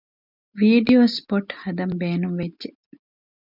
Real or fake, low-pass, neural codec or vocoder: real; 5.4 kHz; none